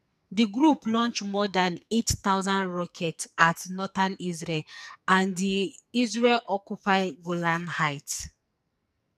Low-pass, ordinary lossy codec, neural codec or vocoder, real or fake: 14.4 kHz; none; codec, 44.1 kHz, 2.6 kbps, SNAC; fake